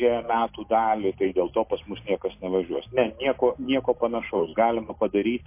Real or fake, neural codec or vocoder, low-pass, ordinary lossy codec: real; none; 3.6 kHz; MP3, 24 kbps